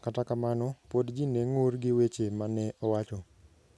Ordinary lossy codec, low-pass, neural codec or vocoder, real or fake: none; none; none; real